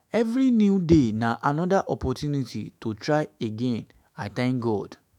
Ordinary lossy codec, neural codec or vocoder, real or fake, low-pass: none; autoencoder, 48 kHz, 128 numbers a frame, DAC-VAE, trained on Japanese speech; fake; 19.8 kHz